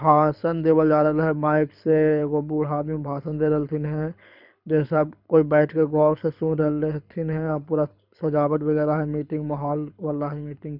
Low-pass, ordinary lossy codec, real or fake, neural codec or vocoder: 5.4 kHz; none; fake; codec, 24 kHz, 6 kbps, HILCodec